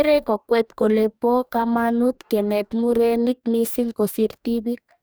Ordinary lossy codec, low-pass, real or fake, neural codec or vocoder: none; none; fake; codec, 44.1 kHz, 2.6 kbps, DAC